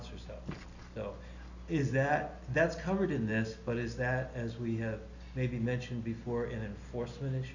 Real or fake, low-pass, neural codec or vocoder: real; 7.2 kHz; none